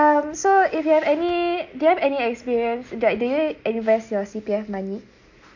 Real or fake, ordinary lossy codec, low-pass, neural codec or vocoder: real; none; 7.2 kHz; none